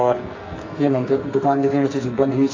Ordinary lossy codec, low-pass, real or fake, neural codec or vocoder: AAC, 32 kbps; 7.2 kHz; fake; codec, 44.1 kHz, 2.6 kbps, SNAC